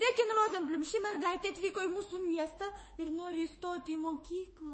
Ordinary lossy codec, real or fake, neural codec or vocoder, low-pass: MP3, 32 kbps; fake; autoencoder, 48 kHz, 32 numbers a frame, DAC-VAE, trained on Japanese speech; 10.8 kHz